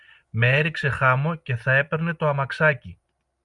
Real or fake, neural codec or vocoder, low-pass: real; none; 10.8 kHz